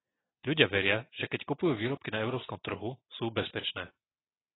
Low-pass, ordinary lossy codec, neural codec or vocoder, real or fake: 7.2 kHz; AAC, 16 kbps; none; real